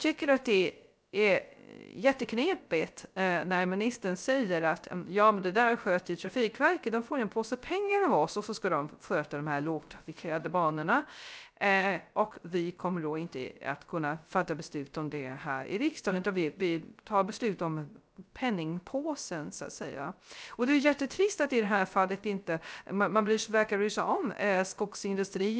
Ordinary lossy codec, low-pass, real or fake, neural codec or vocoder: none; none; fake; codec, 16 kHz, 0.3 kbps, FocalCodec